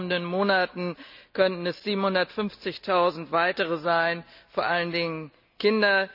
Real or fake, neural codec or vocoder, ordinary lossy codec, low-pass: real; none; none; 5.4 kHz